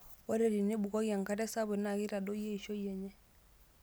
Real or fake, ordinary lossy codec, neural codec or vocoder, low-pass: real; none; none; none